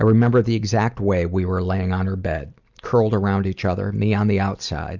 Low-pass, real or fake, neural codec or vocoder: 7.2 kHz; real; none